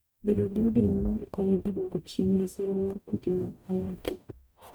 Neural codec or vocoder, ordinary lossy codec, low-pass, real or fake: codec, 44.1 kHz, 0.9 kbps, DAC; none; none; fake